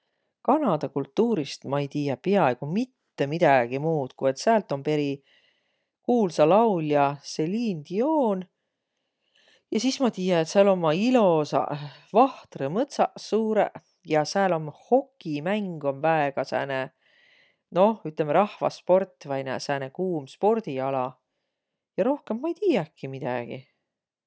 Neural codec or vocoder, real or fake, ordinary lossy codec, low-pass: none; real; none; none